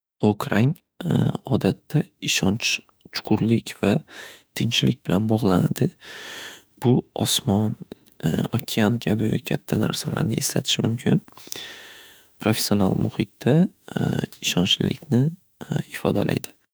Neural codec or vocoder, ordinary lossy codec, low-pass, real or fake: autoencoder, 48 kHz, 32 numbers a frame, DAC-VAE, trained on Japanese speech; none; none; fake